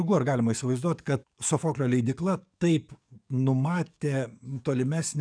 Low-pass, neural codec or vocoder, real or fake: 9.9 kHz; codec, 44.1 kHz, 7.8 kbps, DAC; fake